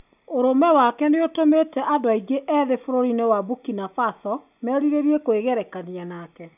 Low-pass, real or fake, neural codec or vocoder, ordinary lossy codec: 3.6 kHz; real; none; none